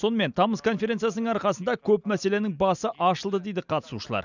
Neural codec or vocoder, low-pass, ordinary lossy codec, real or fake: none; 7.2 kHz; none; real